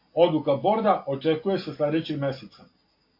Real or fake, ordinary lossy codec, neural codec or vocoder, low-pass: real; MP3, 32 kbps; none; 5.4 kHz